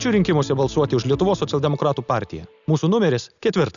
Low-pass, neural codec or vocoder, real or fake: 7.2 kHz; none; real